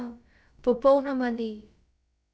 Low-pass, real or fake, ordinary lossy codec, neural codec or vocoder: none; fake; none; codec, 16 kHz, about 1 kbps, DyCAST, with the encoder's durations